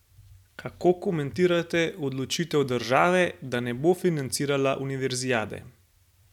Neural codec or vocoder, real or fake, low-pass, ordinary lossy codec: none; real; 19.8 kHz; none